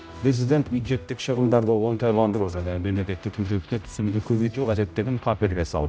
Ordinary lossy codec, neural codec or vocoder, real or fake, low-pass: none; codec, 16 kHz, 0.5 kbps, X-Codec, HuBERT features, trained on general audio; fake; none